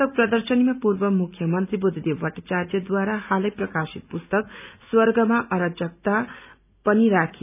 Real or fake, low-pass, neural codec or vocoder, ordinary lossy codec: real; 3.6 kHz; none; none